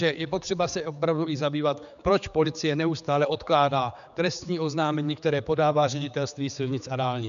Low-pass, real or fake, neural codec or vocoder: 7.2 kHz; fake; codec, 16 kHz, 4 kbps, X-Codec, HuBERT features, trained on general audio